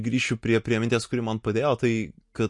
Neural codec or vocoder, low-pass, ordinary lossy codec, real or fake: none; 10.8 kHz; MP3, 48 kbps; real